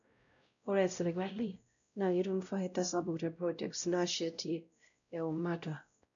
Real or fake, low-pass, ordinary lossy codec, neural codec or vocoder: fake; 7.2 kHz; none; codec, 16 kHz, 0.5 kbps, X-Codec, WavLM features, trained on Multilingual LibriSpeech